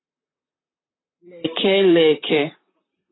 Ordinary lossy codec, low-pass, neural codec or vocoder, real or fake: AAC, 16 kbps; 7.2 kHz; vocoder, 44.1 kHz, 128 mel bands, Pupu-Vocoder; fake